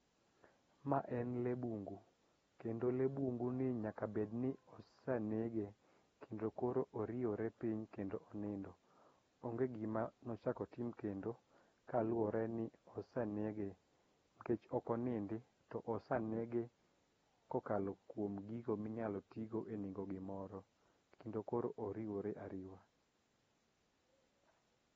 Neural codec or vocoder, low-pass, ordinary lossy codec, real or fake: none; 19.8 kHz; AAC, 24 kbps; real